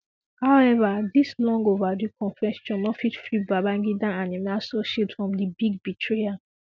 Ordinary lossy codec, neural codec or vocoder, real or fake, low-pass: none; none; real; none